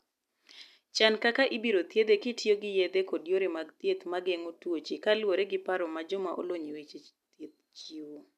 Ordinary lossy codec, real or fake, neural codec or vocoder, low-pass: none; real; none; 14.4 kHz